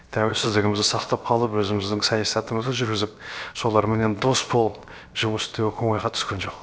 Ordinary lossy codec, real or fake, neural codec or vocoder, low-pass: none; fake; codec, 16 kHz, 0.7 kbps, FocalCodec; none